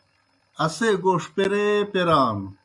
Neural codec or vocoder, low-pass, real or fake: none; 10.8 kHz; real